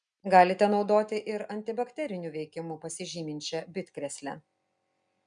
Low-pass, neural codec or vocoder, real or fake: 9.9 kHz; none; real